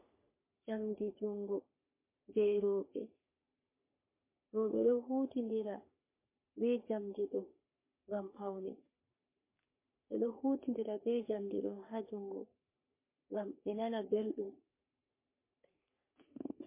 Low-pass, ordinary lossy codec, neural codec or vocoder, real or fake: 3.6 kHz; MP3, 24 kbps; codec, 44.1 kHz, 3.4 kbps, Pupu-Codec; fake